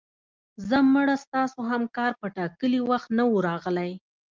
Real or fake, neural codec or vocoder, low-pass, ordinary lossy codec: real; none; 7.2 kHz; Opus, 32 kbps